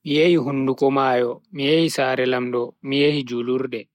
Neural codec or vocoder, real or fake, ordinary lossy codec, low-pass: none; real; MP3, 64 kbps; 19.8 kHz